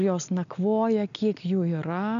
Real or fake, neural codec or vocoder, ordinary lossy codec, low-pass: real; none; AAC, 96 kbps; 7.2 kHz